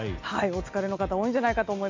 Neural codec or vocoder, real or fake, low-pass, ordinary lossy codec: none; real; 7.2 kHz; MP3, 48 kbps